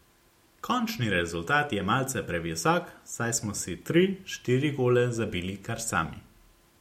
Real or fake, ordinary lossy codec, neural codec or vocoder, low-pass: real; MP3, 64 kbps; none; 19.8 kHz